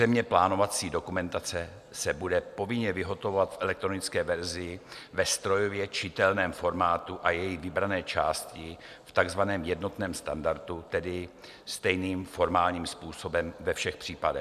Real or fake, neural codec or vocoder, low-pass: real; none; 14.4 kHz